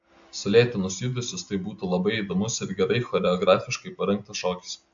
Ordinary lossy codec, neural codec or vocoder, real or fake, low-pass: AAC, 64 kbps; none; real; 7.2 kHz